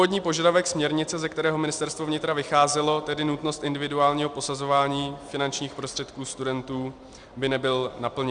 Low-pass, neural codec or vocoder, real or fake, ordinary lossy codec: 9.9 kHz; none; real; Opus, 64 kbps